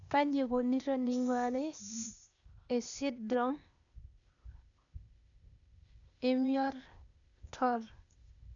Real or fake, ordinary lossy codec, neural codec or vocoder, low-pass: fake; none; codec, 16 kHz, 0.8 kbps, ZipCodec; 7.2 kHz